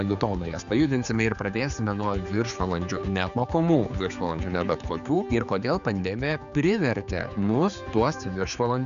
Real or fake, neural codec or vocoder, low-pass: fake; codec, 16 kHz, 4 kbps, X-Codec, HuBERT features, trained on general audio; 7.2 kHz